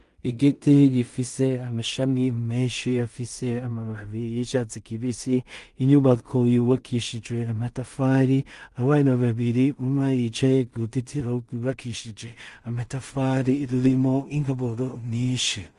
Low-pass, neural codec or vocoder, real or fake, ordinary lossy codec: 10.8 kHz; codec, 16 kHz in and 24 kHz out, 0.4 kbps, LongCat-Audio-Codec, two codebook decoder; fake; Opus, 32 kbps